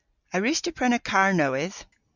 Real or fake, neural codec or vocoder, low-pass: real; none; 7.2 kHz